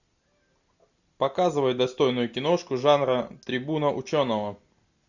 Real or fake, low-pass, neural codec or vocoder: real; 7.2 kHz; none